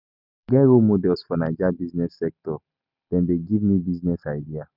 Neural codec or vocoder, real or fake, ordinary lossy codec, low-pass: none; real; none; 5.4 kHz